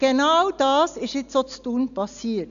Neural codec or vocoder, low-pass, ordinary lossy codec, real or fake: none; 7.2 kHz; none; real